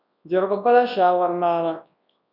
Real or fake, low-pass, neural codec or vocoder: fake; 5.4 kHz; codec, 24 kHz, 0.9 kbps, WavTokenizer, large speech release